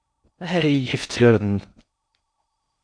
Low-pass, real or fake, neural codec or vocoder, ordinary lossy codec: 9.9 kHz; fake; codec, 16 kHz in and 24 kHz out, 0.6 kbps, FocalCodec, streaming, 2048 codes; MP3, 96 kbps